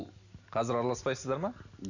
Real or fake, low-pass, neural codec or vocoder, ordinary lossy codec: real; 7.2 kHz; none; none